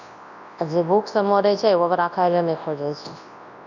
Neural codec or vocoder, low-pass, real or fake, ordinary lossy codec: codec, 24 kHz, 0.9 kbps, WavTokenizer, large speech release; 7.2 kHz; fake; none